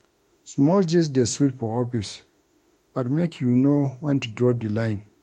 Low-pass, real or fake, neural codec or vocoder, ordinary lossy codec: 19.8 kHz; fake; autoencoder, 48 kHz, 32 numbers a frame, DAC-VAE, trained on Japanese speech; MP3, 64 kbps